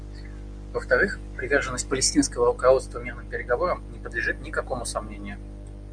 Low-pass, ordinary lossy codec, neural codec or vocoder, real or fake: 9.9 kHz; Opus, 64 kbps; none; real